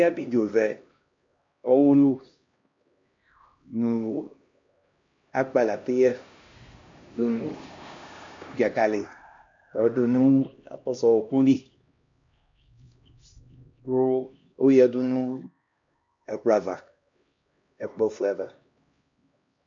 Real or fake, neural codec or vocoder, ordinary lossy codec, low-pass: fake; codec, 16 kHz, 1 kbps, X-Codec, HuBERT features, trained on LibriSpeech; MP3, 48 kbps; 7.2 kHz